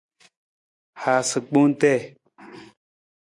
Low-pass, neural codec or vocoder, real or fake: 10.8 kHz; none; real